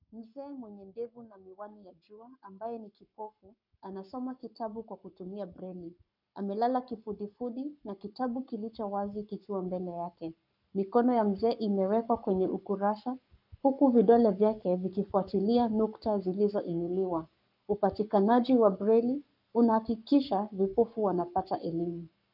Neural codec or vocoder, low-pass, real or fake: codec, 44.1 kHz, 7.8 kbps, Pupu-Codec; 5.4 kHz; fake